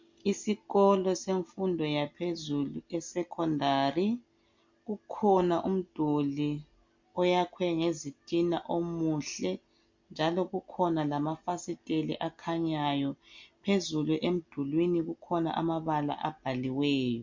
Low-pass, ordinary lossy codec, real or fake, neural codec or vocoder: 7.2 kHz; MP3, 48 kbps; real; none